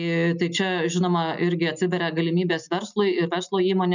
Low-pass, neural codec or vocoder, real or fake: 7.2 kHz; none; real